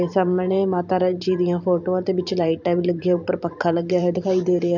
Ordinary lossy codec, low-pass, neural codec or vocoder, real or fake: none; 7.2 kHz; vocoder, 44.1 kHz, 128 mel bands every 512 samples, BigVGAN v2; fake